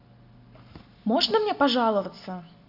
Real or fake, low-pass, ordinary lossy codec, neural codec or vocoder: real; 5.4 kHz; none; none